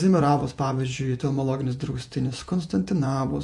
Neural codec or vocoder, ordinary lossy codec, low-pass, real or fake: vocoder, 48 kHz, 128 mel bands, Vocos; MP3, 48 kbps; 10.8 kHz; fake